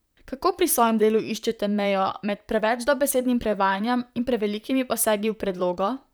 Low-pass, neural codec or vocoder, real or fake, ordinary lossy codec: none; vocoder, 44.1 kHz, 128 mel bands, Pupu-Vocoder; fake; none